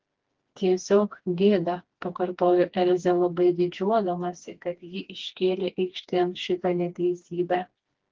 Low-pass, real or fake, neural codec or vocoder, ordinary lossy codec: 7.2 kHz; fake; codec, 16 kHz, 2 kbps, FreqCodec, smaller model; Opus, 16 kbps